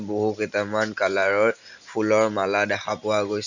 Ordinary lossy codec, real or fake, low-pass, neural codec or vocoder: none; real; 7.2 kHz; none